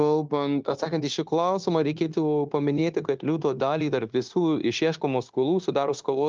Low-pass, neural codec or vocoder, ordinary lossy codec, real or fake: 7.2 kHz; codec, 16 kHz, 0.9 kbps, LongCat-Audio-Codec; Opus, 24 kbps; fake